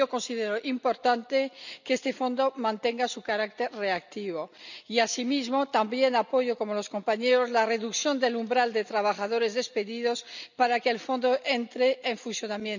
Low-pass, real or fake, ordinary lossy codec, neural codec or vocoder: 7.2 kHz; real; none; none